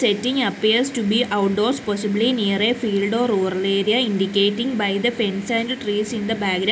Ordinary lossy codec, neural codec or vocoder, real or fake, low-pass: none; none; real; none